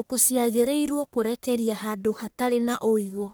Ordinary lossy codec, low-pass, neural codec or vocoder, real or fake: none; none; codec, 44.1 kHz, 1.7 kbps, Pupu-Codec; fake